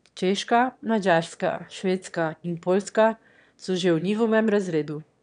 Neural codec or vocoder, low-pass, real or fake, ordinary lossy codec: autoencoder, 22.05 kHz, a latent of 192 numbers a frame, VITS, trained on one speaker; 9.9 kHz; fake; none